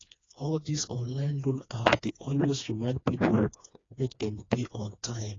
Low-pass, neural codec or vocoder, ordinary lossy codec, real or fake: 7.2 kHz; codec, 16 kHz, 2 kbps, FreqCodec, smaller model; AAC, 32 kbps; fake